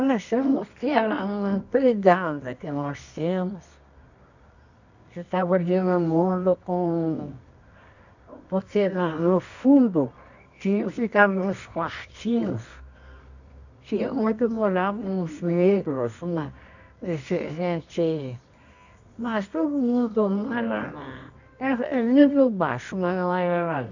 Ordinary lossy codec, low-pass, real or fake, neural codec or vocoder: none; 7.2 kHz; fake; codec, 24 kHz, 0.9 kbps, WavTokenizer, medium music audio release